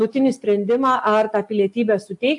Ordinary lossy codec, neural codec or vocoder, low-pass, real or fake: AAC, 64 kbps; none; 10.8 kHz; real